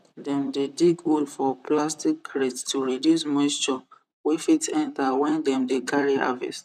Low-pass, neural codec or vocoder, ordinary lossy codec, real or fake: 14.4 kHz; vocoder, 44.1 kHz, 128 mel bands, Pupu-Vocoder; none; fake